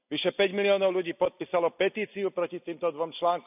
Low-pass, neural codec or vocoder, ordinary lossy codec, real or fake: 3.6 kHz; none; none; real